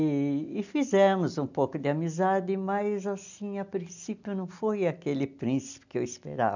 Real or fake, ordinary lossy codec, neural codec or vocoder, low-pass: real; none; none; 7.2 kHz